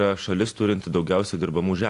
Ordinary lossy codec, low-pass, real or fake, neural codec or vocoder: AAC, 48 kbps; 10.8 kHz; real; none